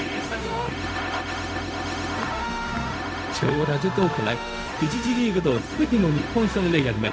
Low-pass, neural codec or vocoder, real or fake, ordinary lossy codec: none; codec, 16 kHz, 0.4 kbps, LongCat-Audio-Codec; fake; none